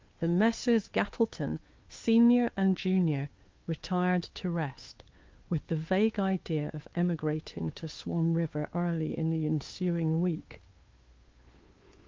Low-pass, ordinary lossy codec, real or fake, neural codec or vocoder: 7.2 kHz; Opus, 32 kbps; fake; codec, 16 kHz, 2 kbps, FunCodec, trained on Chinese and English, 25 frames a second